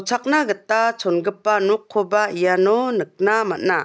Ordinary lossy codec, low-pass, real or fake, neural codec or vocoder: none; none; real; none